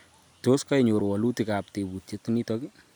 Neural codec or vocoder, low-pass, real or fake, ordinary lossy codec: none; none; real; none